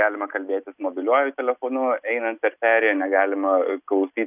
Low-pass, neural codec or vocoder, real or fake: 3.6 kHz; none; real